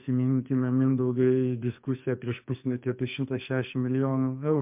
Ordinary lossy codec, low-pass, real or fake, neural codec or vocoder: MP3, 32 kbps; 3.6 kHz; fake; codec, 44.1 kHz, 2.6 kbps, SNAC